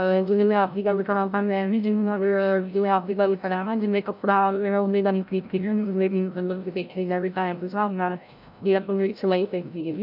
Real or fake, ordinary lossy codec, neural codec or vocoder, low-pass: fake; none; codec, 16 kHz, 0.5 kbps, FreqCodec, larger model; 5.4 kHz